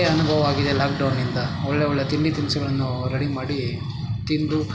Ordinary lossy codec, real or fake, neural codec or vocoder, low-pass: none; real; none; none